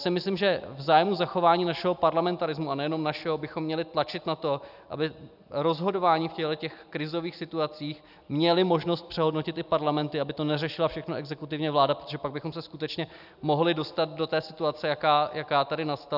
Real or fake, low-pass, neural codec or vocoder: real; 5.4 kHz; none